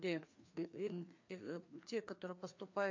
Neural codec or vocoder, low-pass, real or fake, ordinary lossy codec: codec, 16 kHz, 2 kbps, FreqCodec, larger model; 7.2 kHz; fake; MP3, 48 kbps